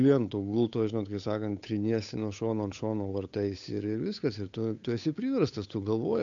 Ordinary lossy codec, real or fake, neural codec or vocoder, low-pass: AAC, 64 kbps; fake; codec, 16 kHz, 8 kbps, FunCodec, trained on Chinese and English, 25 frames a second; 7.2 kHz